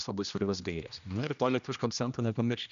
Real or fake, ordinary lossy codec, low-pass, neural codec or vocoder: fake; MP3, 96 kbps; 7.2 kHz; codec, 16 kHz, 1 kbps, X-Codec, HuBERT features, trained on general audio